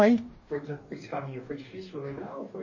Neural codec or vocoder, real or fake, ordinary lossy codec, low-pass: codec, 44.1 kHz, 2.6 kbps, DAC; fake; MP3, 32 kbps; 7.2 kHz